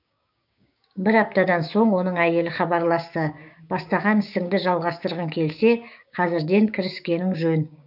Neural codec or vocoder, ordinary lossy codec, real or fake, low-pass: codec, 16 kHz, 6 kbps, DAC; none; fake; 5.4 kHz